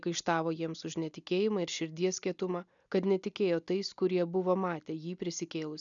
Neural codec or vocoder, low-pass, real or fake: none; 7.2 kHz; real